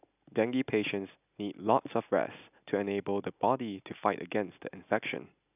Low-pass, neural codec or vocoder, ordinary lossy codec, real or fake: 3.6 kHz; none; none; real